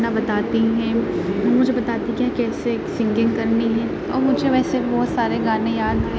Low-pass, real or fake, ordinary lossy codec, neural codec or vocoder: none; real; none; none